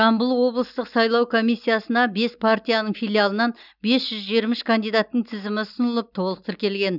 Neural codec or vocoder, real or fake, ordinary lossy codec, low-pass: none; real; none; 5.4 kHz